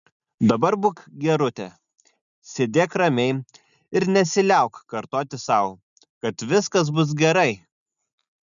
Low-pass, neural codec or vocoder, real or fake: 7.2 kHz; none; real